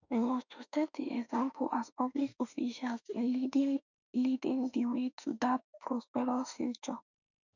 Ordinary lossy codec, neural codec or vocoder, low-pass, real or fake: none; autoencoder, 48 kHz, 32 numbers a frame, DAC-VAE, trained on Japanese speech; 7.2 kHz; fake